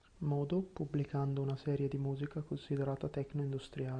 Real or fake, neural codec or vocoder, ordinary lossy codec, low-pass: real; none; Opus, 64 kbps; 9.9 kHz